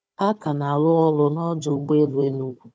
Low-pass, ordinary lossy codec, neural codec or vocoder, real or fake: none; none; codec, 16 kHz, 4 kbps, FunCodec, trained on Chinese and English, 50 frames a second; fake